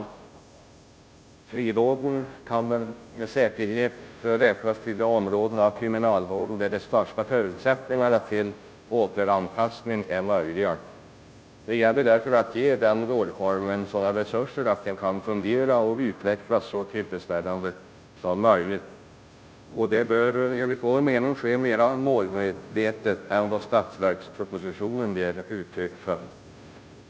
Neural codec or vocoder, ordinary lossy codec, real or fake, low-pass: codec, 16 kHz, 0.5 kbps, FunCodec, trained on Chinese and English, 25 frames a second; none; fake; none